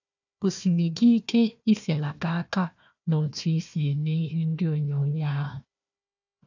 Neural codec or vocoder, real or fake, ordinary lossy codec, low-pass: codec, 16 kHz, 1 kbps, FunCodec, trained on Chinese and English, 50 frames a second; fake; none; 7.2 kHz